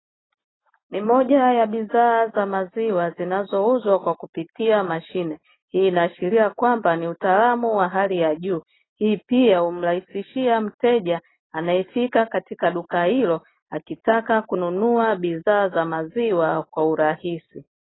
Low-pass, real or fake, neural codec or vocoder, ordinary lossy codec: 7.2 kHz; real; none; AAC, 16 kbps